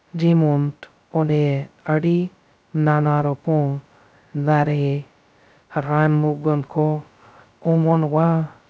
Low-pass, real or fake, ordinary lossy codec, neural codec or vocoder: none; fake; none; codec, 16 kHz, 0.2 kbps, FocalCodec